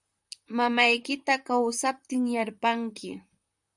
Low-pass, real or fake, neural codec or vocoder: 10.8 kHz; fake; vocoder, 44.1 kHz, 128 mel bands, Pupu-Vocoder